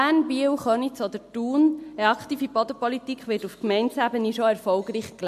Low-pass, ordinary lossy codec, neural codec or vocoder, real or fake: 14.4 kHz; MP3, 64 kbps; none; real